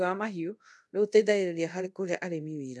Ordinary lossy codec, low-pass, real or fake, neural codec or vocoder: none; none; fake; codec, 24 kHz, 0.5 kbps, DualCodec